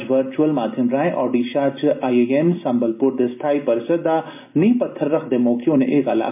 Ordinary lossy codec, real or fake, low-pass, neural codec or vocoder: MP3, 32 kbps; real; 3.6 kHz; none